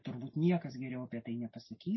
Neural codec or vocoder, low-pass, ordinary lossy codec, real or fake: none; 7.2 kHz; MP3, 24 kbps; real